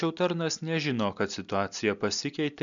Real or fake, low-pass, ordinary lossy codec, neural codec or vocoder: real; 7.2 kHz; MP3, 96 kbps; none